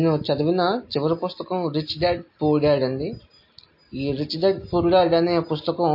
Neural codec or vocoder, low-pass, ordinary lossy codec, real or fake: none; 5.4 kHz; MP3, 32 kbps; real